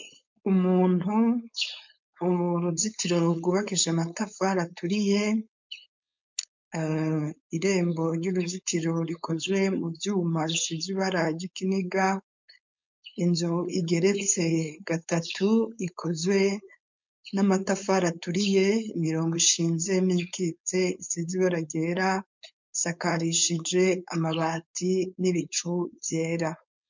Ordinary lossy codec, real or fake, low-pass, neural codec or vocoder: MP3, 64 kbps; fake; 7.2 kHz; codec, 16 kHz, 4.8 kbps, FACodec